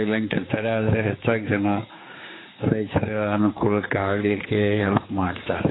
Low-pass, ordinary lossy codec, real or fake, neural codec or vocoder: 7.2 kHz; AAC, 16 kbps; fake; codec, 16 kHz, 4 kbps, X-Codec, HuBERT features, trained on general audio